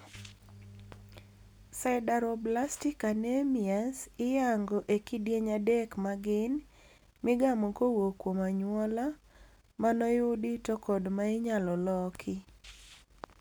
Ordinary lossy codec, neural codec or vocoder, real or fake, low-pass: none; none; real; none